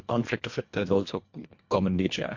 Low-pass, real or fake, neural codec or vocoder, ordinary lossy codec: 7.2 kHz; fake; codec, 24 kHz, 1.5 kbps, HILCodec; MP3, 48 kbps